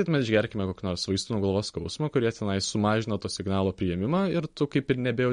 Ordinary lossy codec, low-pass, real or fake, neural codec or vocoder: MP3, 48 kbps; 19.8 kHz; real; none